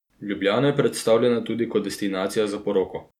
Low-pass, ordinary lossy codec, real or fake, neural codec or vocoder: 19.8 kHz; none; real; none